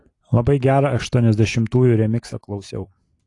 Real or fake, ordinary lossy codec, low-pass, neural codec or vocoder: real; AAC, 48 kbps; 10.8 kHz; none